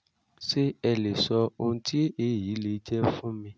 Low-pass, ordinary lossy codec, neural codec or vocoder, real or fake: none; none; none; real